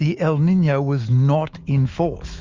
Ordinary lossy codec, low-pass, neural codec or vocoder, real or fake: Opus, 32 kbps; 7.2 kHz; autoencoder, 48 kHz, 128 numbers a frame, DAC-VAE, trained on Japanese speech; fake